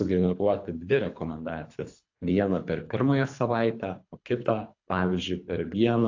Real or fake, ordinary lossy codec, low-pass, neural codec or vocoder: fake; AAC, 48 kbps; 7.2 kHz; codec, 24 kHz, 3 kbps, HILCodec